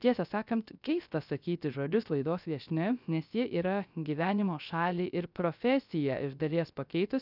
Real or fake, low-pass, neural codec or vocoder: fake; 5.4 kHz; codec, 16 kHz, 0.3 kbps, FocalCodec